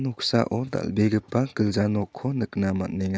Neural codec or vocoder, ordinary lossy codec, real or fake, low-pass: none; none; real; none